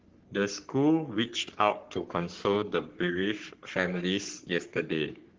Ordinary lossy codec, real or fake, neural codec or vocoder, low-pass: Opus, 16 kbps; fake; codec, 44.1 kHz, 3.4 kbps, Pupu-Codec; 7.2 kHz